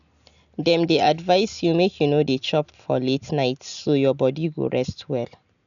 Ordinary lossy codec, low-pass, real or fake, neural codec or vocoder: none; 7.2 kHz; real; none